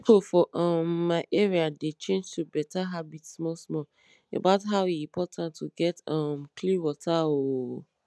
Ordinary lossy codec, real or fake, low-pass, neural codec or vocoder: none; real; none; none